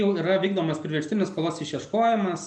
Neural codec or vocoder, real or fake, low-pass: none; real; 9.9 kHz